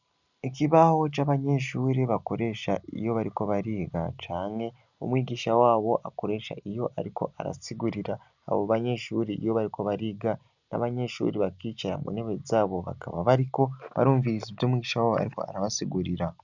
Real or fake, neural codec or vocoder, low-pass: real; none; 7.2 kHz